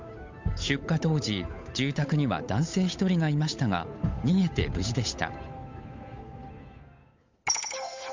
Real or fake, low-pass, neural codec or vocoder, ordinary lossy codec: fake; 7.2 kHz; codec, 16 kHz, 8 kbps, FunCodec, trained on Chinese and English, 25 frames a second; MP3, 64 kbps